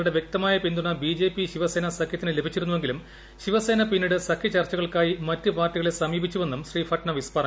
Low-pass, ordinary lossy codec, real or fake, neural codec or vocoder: none; none; real; none